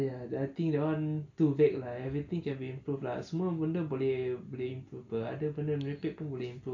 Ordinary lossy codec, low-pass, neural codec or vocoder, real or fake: AAC, 48 kbps; 7.2 kHz; none; real